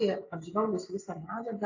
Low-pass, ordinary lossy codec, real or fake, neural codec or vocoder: 7.2 kHz; AAC, 48 kbps; real; none